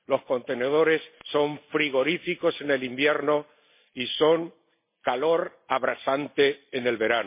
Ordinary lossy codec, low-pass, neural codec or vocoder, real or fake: MP3, 24 kbps; 3.6 kHz; none; real